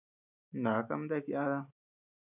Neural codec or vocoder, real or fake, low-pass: none; real; 3.6 kHz